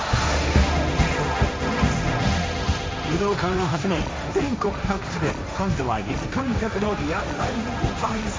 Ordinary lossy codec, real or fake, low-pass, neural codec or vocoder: none; fake; none; codec, 16 kHz, 1.1 kbps, Voila-Tokenizer